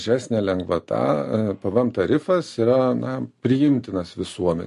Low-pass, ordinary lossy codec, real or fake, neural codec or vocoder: 14.4 kHz; MP3, 48 kbps; real; none